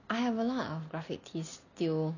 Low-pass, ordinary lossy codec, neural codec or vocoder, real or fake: 7.2 kHz; none; none; real